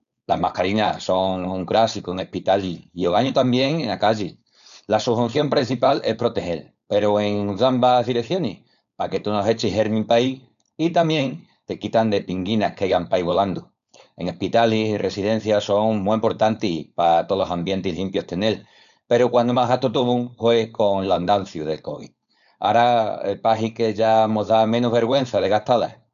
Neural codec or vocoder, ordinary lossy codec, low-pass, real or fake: codec, 16 kHz, 4.8 kbps, FACodec; none; 7.2 kHz; fake